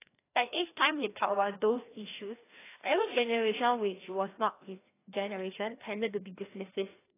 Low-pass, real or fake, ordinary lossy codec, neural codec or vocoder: 3.6 kHz; fake; AAC, 16 kbps; codec, 16 kHz, 1 kbps, FreqCodec, larger model